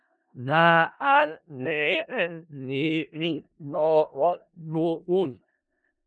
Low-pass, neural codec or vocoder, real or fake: 9.9 kHz; codec, 16 kHz in and 24 kHz out, 0.4 kbps, LongCat-Audio-Codec, four codebook decoder; fake